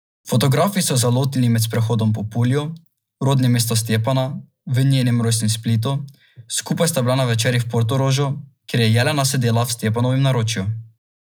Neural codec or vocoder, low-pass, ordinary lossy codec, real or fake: none; none; none; real